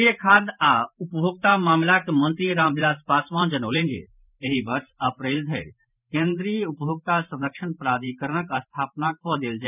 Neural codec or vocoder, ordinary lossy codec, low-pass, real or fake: vocoder, 44.1 kHz, 128 mel bands every 512 samples, BigVGAN v2; none; 3.6 kHz; fake